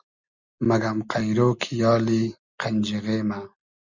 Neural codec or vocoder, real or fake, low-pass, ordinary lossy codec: none; real; 7.2 kHz; Opus, 64 kbps